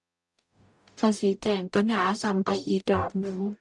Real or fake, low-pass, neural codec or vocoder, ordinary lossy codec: fake; 10.8 kHz; codec, 44.1 kHz, 0.9 kbps, DAC; none